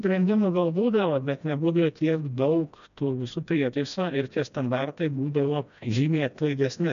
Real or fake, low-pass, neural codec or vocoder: fake; 7.2 kHz; codec, 16 kHz, 1 kbps, FreqCodec, smaller model